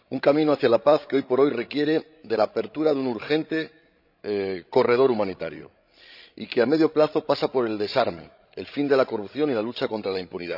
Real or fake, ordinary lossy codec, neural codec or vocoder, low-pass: fake; none; codec, 16 kHz, 16 kbps, FreqCodec, larger model; 5.4 kHz